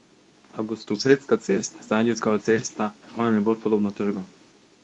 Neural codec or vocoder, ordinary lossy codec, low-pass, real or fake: codec, 24 kHz, 0.9 kbps, WavTokenizer, medium speech release version 2; none; 10.8 kHz; fake